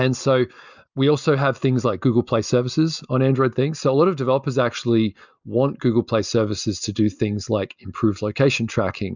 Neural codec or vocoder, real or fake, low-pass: none; real; 7.2 kHz